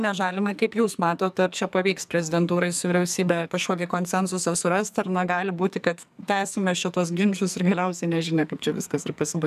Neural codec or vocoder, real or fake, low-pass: codec, 44.1 kHz, 2.6 kbps, SNAC; fake; 14.4 kHz